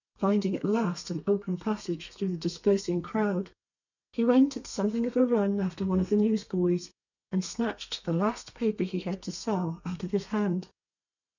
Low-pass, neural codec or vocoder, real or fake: 7.2 kHz; codec, 32 kHz, 1.9 kbps, SNAC; fake